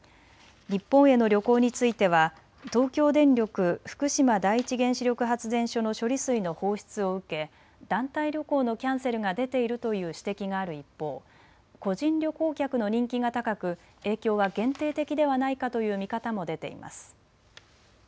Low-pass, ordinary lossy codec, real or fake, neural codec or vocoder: none; none; real; none